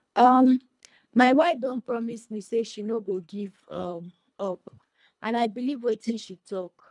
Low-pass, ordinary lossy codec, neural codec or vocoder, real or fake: 10.8 kHz; none; codec, 24 kHz, 1.5 kbps, HILCodec; fake